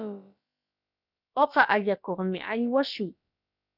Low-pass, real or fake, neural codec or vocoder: 5.4 kHz; fake; codec, 16 kHz, about 1 kbps, DyCAST, with the encoder's durations